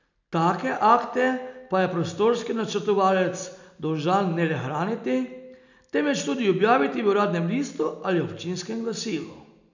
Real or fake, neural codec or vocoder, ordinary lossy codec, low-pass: real; none; none; 7.2 kHz